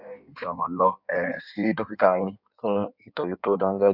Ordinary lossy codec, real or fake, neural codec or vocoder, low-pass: none; fake; codec, 16 kHz in and 24 kHz out, 1.1 kbps, FireRedTTS-2 codec; 5.4 kHz